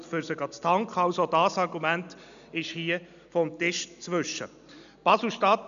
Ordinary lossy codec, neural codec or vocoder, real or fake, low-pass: none; none; real; 7.2 kHz